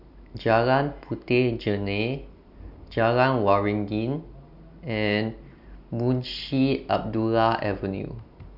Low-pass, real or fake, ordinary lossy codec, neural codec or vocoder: 5.4 kHz; real; none; none